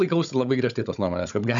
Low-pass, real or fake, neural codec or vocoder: 7.2 kHz; fake; codec, 16 kHz, 16 kbps, FunCodec, trained on Chinese and English, 50 frames a second